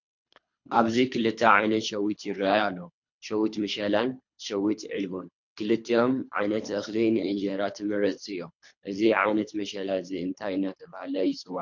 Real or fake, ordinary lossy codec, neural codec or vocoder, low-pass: fake; MP3, 48 kbps; codec, 24 kHz, 3 kbps, HILCodec; 7.2 kHz